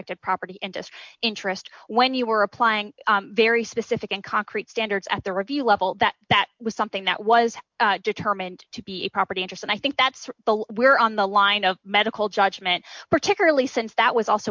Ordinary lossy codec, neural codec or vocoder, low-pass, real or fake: MP3, 64 kbps; none; 7.2 kHz; real